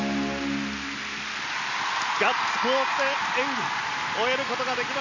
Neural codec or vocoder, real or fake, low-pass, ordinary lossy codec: none; real; 7.2 kHz; none